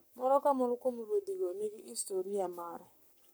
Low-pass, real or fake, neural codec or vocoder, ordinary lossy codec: none; fake; codec, 44.1 kHz, 7.8 kbps, Pupu-Codec; none